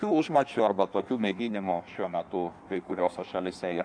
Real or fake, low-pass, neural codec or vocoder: fake; 9.9 kHz; codec, 16 kHz in and 24 kHz out, 1.1 kbps, FireRedTTS-2 codec